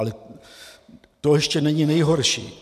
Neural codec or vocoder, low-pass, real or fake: none; 14.4 kHz; real